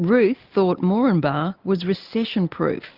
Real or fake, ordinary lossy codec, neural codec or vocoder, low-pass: real; Opus, 24 kbps; none; 5.4 kHz